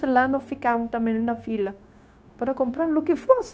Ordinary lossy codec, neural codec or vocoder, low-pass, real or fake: none; codec, 16 kHz, 0.9 kbps, LongCat-Audio-Codec; none; fake